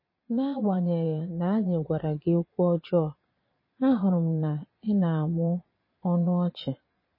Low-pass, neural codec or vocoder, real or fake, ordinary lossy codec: 5.4 kHz; vocoder, 22.05 kHz, 80 mel bands, Vocos; fake; MP3, 24 kbps